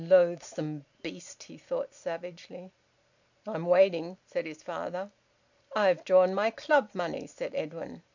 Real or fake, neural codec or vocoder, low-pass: real; none; 7.2 kHz